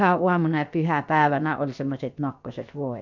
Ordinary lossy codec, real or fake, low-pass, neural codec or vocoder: none; fake; 7.2 kHz; codec, 16 kHz, about 1 kbps, DyCAST, with the encoder's durations